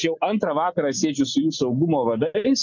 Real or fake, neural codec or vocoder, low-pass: real; none; 7.2 kHz